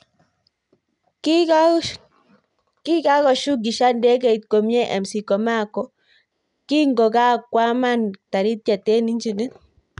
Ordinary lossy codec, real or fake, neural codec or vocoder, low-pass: none; real; none; 10.8 kHz